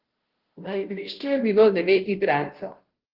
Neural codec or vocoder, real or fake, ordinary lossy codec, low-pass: codec, 16 kHz, 0.5 kbps, FunCodec, trained on Chinese and English, 25 frames a second; fake; Opus, 16 kbps; 5.4 kHz